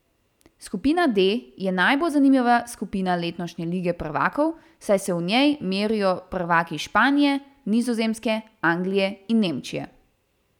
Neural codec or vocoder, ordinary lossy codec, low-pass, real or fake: none; none; 19.8 kHz; real